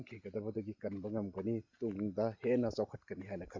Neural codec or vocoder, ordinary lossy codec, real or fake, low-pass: none; Opus, 64 kbps; real; 7.2 kHz